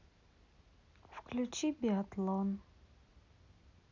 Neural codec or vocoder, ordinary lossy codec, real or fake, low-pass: none; none; real; 7.2 kHz